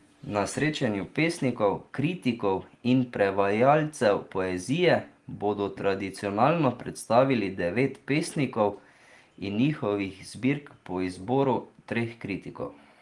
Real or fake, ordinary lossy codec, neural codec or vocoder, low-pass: real; Opus, 24 kbps; none; 10.8 kHz